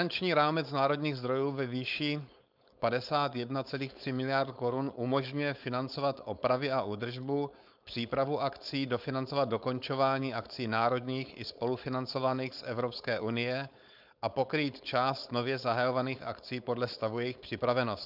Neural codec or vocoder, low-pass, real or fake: codec, 16 kHz, 4.8 kbps, FACodec; 5.4 kHz; fake